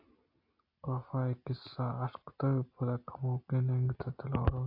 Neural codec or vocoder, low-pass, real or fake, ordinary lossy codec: none; 5.4 kHz; real; AAC, 32 kbps